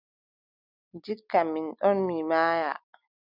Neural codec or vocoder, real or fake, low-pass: none; real; 5.4 kHz